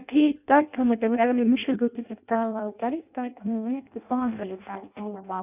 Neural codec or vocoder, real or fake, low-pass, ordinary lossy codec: codec, 16 kHz in and 24 kHz out, 0.6 kbps, FireRedTTS-2 codec; fake; 3.6 kHz; none